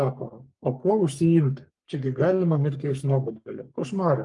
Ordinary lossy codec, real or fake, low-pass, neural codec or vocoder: Opus, 32 kbps; fake; 10.8 kHz; codec, 44.1 kHz, 3.4 kbps, Pupu-Codec